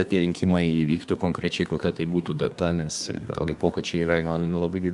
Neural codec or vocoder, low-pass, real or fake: codec, 24 kHz, 1 kbps, SNAC; 10.8 kHz; fake